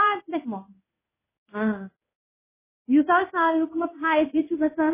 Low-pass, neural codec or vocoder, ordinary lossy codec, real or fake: 3.6 kHz; codec, 16 kHz in and 24 kHz out, 1 kbps, XY-Tokenizer; MP3, 16 kbps; fake